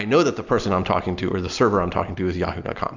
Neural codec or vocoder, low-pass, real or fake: none; 7.2 kHz; real